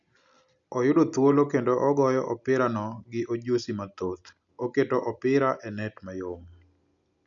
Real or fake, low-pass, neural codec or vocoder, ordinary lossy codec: real; 7.2 kHz; none; none